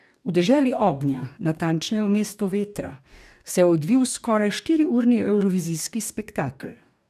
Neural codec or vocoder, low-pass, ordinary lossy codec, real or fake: codec, 44.1 kHz, 2.6 kbps, DAC; 14.4 kHz; none; fake